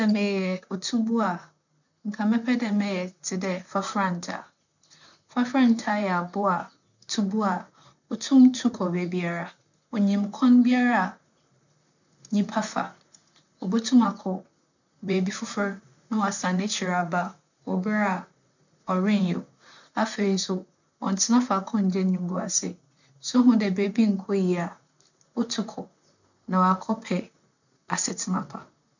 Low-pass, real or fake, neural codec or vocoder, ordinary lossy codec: 7.2 kHz; fake; vocoder, 44.1 kHz, 128 mel bands, Pupu-Vocoder; none